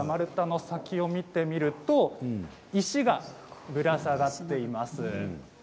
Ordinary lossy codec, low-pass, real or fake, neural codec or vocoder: none; none; real; none